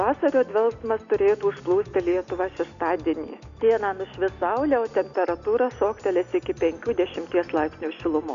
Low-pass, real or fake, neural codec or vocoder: 7.2 kHz; real; none